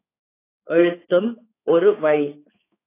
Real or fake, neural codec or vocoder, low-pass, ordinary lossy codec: fake; codec, 16 kHz, 4 kbps, X-Codec, HuBERT features, trained on balanced general audio; 3.6 kHz; AAC, 16 kbps